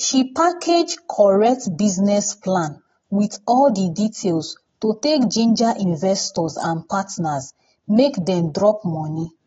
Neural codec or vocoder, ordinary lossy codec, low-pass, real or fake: none; AAC, 24 kbps; 7.2 kHz; real